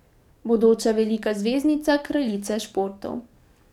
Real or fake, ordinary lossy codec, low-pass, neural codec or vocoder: fake; none; 19.8 kHz; codec, 44.1 kHz, 7.8 kbps, DAC